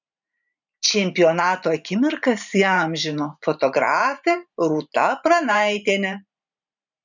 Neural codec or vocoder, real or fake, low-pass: vocoder, 44.1 kHz, 128 mel bands every 512 samples, BigVGAN v2; fake; 7.2 kHz